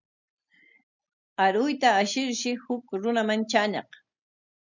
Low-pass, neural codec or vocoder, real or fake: 7.2 kHz; none; real